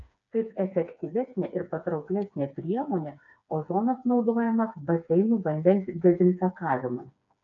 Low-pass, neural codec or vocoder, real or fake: 7.2 kHz; codec, 16 kHz, 4 kbps, FreqCodec, smaller model; fake